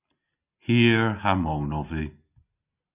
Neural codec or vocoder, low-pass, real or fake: none; 3.6 kHz; real